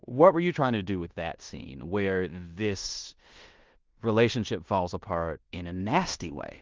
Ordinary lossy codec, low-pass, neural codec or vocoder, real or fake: Opus, 32 kbps; 7.2 kHz; codec, 16 kHz in and 24 kHz out, 0.9 kbps, LongCat-Audio-Codec, fine tuned four codebook decoder; fake